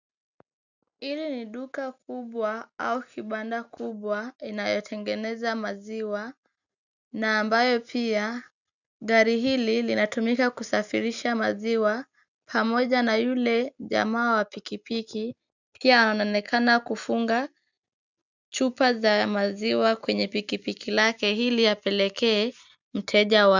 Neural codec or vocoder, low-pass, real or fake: none; 7.2 kHz; real